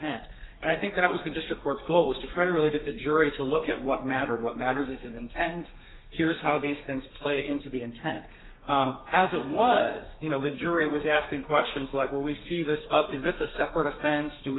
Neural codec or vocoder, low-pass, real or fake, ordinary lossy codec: codec, 32 kHz, 1.9 kbps, SNAC; 7.2 kHz; fake; AAC, 16 kbps